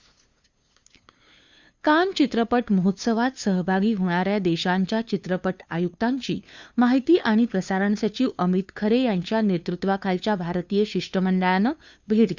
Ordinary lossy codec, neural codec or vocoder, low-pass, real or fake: Opus, 64 kbps; codec, 16 kHz, 2 kbps, FunCodec, trained on LibriTTS, 25 frames a second; 7.2 kHz; fake